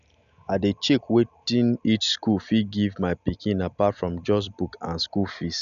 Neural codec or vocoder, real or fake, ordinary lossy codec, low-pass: none; real; none; 7.2 kHz